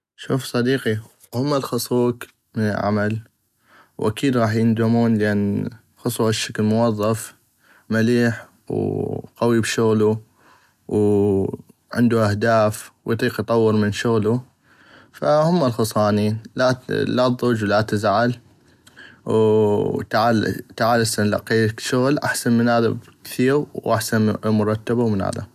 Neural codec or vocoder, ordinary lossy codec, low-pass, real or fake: none; none; 14.4 kHz; real